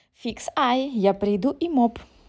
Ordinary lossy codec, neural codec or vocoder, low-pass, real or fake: none; none; none; real